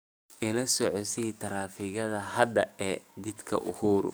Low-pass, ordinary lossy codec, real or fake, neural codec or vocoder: none; none; fake; codec, 44.1 kHz, 7.8 kbps, DAC